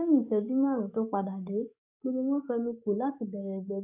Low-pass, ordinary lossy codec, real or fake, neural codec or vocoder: 3.6 kHz; none; fake; codec, 44.1 kHz, 7.8 kbps, DAC